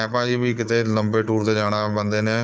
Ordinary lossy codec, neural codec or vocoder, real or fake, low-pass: none; codec, 16 kHz, 4 kbps, FunCodec, trained on Chinese and English, 50 frames a second; fake; none